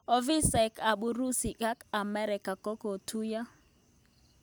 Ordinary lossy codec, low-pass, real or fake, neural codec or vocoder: none; none; real; none